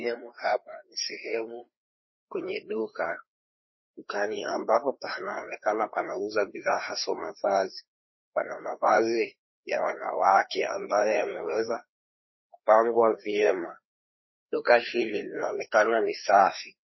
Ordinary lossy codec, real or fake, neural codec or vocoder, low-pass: MP3, 24 kbps; fake; codec, 16 kHz, 2 kbps, FreqCodec, larger model; 7.2 kHz